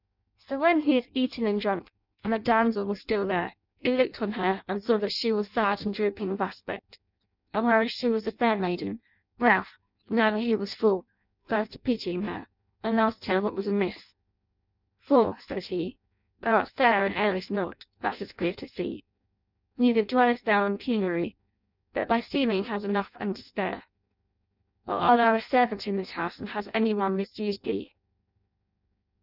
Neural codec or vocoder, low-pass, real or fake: codec, 16 kHz in and 24 kHz out, 0.6 kbps, FireRedTTS-2 codec; 5.4 kHz; fake